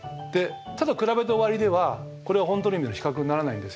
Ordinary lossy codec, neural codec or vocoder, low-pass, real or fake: none; none; none; real